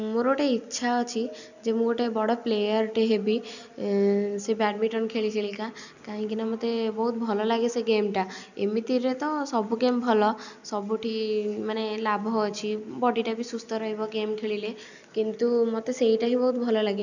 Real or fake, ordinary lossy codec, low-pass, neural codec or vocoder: real; none; 7.2 kHz; none